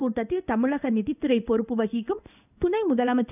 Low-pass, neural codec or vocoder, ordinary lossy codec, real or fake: 3.6 kHz; codec, 16 kHz in and 24 kHz out, 1 kbps, XY-Tokenizer; none; fake